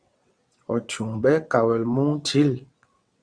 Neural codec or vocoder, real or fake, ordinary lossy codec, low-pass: vocoder, 22.05 kHz, 80 mel bands, WaveNeXt; fake; Opus, 64 kbps; 9.9 kHz